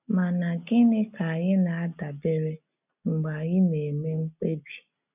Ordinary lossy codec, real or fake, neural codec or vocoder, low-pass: none; real; none; 3.6 kHz